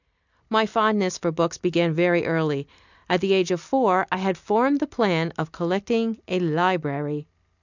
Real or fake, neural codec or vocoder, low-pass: real; none; 7.2 kHz